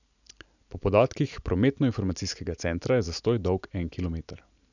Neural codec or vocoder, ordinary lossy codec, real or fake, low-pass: none; none; real; 7.2 kHz